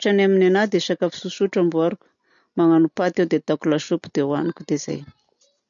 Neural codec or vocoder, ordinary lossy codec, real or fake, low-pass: none; none; real; 7.2 kHz